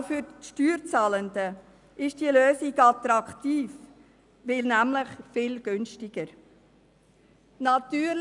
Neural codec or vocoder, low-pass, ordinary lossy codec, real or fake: none; 10.8 kHz; none; real